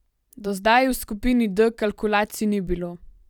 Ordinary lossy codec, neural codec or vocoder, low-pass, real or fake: none; vocoder, 44.1 kHz, 128 mel bands every 256 samples, BigVGAN v2; 19.8 kHz; fake